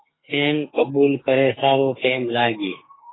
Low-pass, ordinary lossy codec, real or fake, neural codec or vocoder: 7.2 kHz; AAC, 16 kbps; fake; codec, 44.1 kHz, 2.6 kbps, SNAC